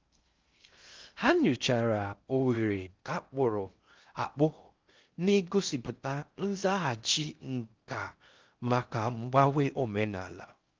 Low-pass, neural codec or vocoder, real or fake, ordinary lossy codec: 7.2 kHz; codec, 16 kHz in and 24 kHz out, 0.6 kbps, FocalCodec, streaming, 2048 codes; fake; Opus, 24 kbps